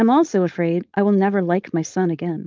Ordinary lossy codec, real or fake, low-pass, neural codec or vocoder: Opus, 24 kbps; fake; 7.2 kHz; codec, 16 kHz, 4.8 kbps, FACodec